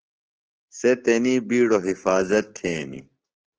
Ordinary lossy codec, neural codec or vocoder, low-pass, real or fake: Opus, 16 kbps; codec, 16 kHz, 6 kbps, DAC; 7.2 kHz; fake